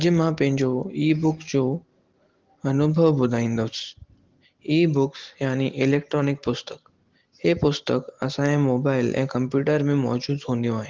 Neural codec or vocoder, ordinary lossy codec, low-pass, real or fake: none; Opus, 16 kbps; 7.2 kHz; real